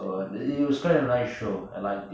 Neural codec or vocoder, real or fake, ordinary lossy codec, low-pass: none; real; none; none